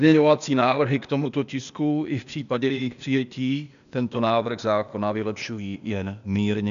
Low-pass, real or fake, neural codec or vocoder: 7.2 kHz; fake; codec, 16 kHz, 0.8 kbps, ZipCodec